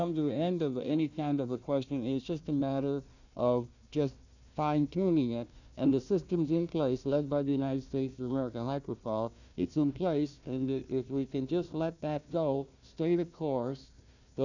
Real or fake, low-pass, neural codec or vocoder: fake; 7.2 kHz; codec, 16 kHz, 1 kbps, FunCodec, trained on Chinese and English, 50 frames a second